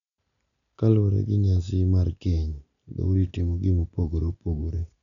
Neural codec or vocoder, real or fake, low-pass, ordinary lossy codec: none; real; 7.2 kHz; none